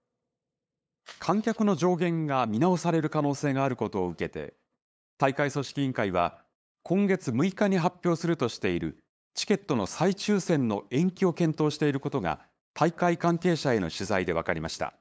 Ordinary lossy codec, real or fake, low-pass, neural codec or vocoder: none; fake; none; codec, 16 kHz, 8 kbps, FunCodec, trained on LibriTTS, 25 frames a second